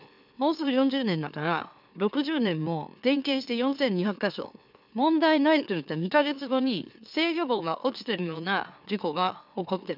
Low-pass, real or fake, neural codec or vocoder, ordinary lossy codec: 5.4 kHz; fake; autoencoder, 44.1 kHz, a latent of 192 numbers a frame, MeloTTS; none